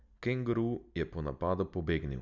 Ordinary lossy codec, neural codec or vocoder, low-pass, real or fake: none; none; 7.2 kHz; real